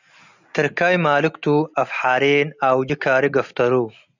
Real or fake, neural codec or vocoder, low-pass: real; none; 7.2 kHz